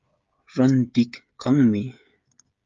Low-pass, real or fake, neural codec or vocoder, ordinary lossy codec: 7.2 kHz; fake; codec, 16 kHz, 16 kbps, FreqCodec, smaller model; Opus, 32 kbps